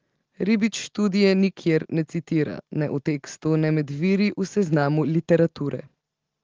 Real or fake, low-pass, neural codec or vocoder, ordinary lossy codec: real; 7.2 kHz; none; Opus, 16 kbps